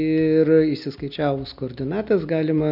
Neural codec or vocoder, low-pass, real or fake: none; 5.4 kHz; real